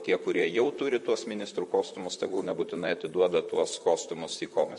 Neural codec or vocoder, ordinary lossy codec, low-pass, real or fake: vocoder, 44.1 kHz, 128 mel bands, Pupu-Vocoder; MP3, 48 kbps; 14.4 kHz; fake